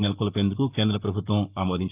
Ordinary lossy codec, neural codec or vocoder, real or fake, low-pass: Opus, 24 kbps; codec, 44.1 kHz, 7.8 kbps, Pupu-Codec; fake; 3.6 kHz